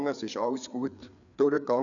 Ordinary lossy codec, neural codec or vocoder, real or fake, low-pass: none; codec, 16 kHz, 4 kbps, FreqCodec, larger model; fake; 7.2 kHz